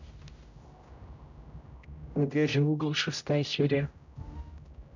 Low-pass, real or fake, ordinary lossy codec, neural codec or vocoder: 7.2 kHz; fake; none; codec, 16 kHz, 0.5 kbps, X-Codec, HuBERT features, trained on general audio